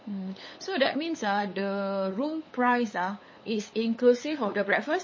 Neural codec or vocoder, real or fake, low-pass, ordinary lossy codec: codec, 16 kHz, 8 kbps, FunCodec, trained on LibriTTS, 25 frames a second; fake; 7.2 kHz; MP3, 32 kbps